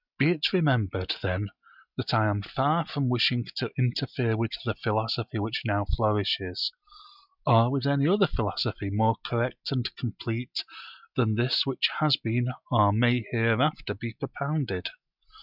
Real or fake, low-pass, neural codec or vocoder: real; 5.4 kHz; none